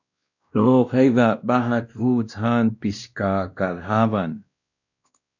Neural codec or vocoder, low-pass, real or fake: codec, 16 kHz, 1 kbps, X-Codec, WavLM features, trained on Multilingual LibriSpeech; 7.2 kHz; fake